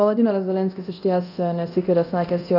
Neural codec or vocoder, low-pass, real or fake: codec, 24 kHz, 0.9 kbps, DualCodec; 5.4 kHz; fake